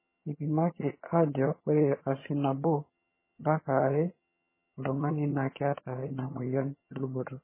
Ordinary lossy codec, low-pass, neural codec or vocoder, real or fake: MP3, 16 kbps; 3.6 kHz; vocoder, 22.05 kHz, 80 mel bands, HiFi-GAN; fake